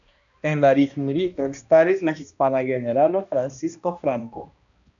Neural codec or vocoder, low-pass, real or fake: codec, 16 kHz, 1 kbps, X-Codec, HuBERT features, trained on balanced general audio; 7.2 kHz; fake